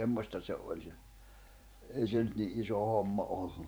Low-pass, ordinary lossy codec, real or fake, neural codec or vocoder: none; none; fake; vocoder, 44.1 kHz, 128 mel bands every 512 samples, BigVGAN v2